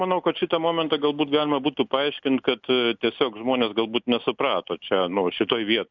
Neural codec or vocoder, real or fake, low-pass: none; real; 7.2 kHz